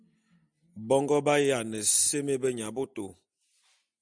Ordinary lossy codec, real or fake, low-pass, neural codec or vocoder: MP3, 96 kbps; real; 9.9 kHz; none